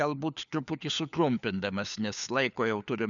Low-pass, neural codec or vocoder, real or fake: 7.2 kHz; codec, 16 kHz, 4 kbps, FunCodec, trained on LibriTTS, 50 frames a second; fake